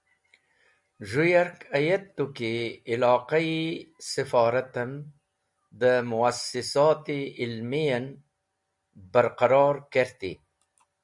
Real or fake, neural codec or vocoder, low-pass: real; none; 10.8 kHz